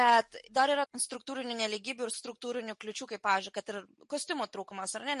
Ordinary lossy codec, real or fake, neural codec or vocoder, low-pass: MP3, 48 kbps; real; none; 14.4 kHz